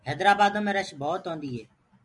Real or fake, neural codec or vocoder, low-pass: real; none; 10.8 kHz